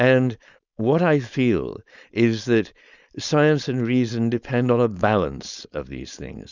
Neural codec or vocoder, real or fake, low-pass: codec, 16 kHz, 4.8 kbps, FACodec; fake; 7.2 kHz